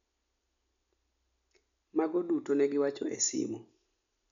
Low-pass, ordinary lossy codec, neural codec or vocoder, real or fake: 7.2 kHz; none; none; real